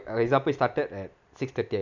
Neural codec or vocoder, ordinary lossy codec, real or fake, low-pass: none; none; real; 7.2 kHz